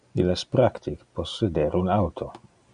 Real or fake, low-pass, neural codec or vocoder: real; 9.9 kHz; none